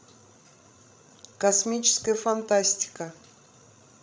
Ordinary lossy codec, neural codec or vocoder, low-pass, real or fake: none; codec, 16 kHz, 16 kbps, FreqCodec, larger model; none; fake